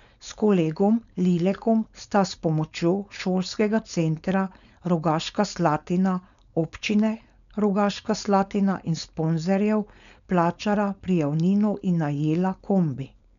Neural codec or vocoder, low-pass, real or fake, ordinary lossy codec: codec, 16 kHz, 4.8 kbps, FACodec; 7.2 kHz; fake; none